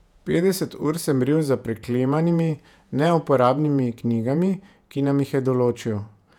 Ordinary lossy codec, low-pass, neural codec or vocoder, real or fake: none; 19.8 kHz; vocoder, 48 kHz, 128 mel bands, Vocos; fake